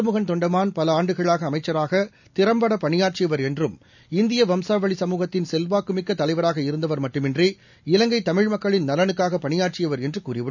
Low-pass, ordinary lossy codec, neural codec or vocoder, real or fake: 7.2 kHz; none; none; real